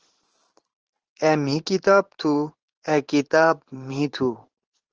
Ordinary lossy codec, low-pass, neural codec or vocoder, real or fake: Opus, 16 kbps; 7.2 kHz; vocoder, 44.1 kHz, 128 mel bands every 512 samples, BigVGAN v2; fake